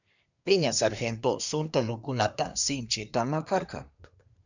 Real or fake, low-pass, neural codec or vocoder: fake; 7.2 kHz; codec, 24 kHz, 1 kbps, SNAC